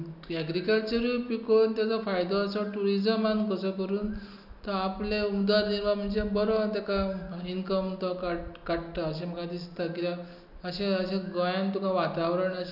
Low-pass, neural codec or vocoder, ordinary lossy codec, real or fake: 5.4 kHz; none; none; real